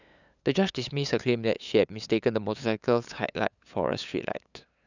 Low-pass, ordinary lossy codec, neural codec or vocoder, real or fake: 7.2 kHz; none; codec, 16 kHz, 8 kbps, FunCodec, trained on LibriTTS, 25 frames a second; fake